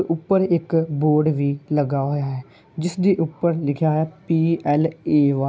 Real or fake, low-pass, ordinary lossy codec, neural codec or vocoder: real; none; none; none